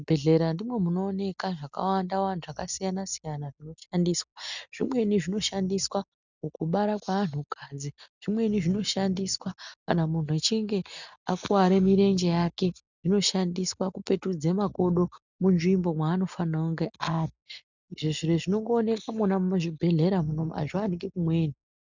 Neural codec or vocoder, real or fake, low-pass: none; real; 7.2 kHz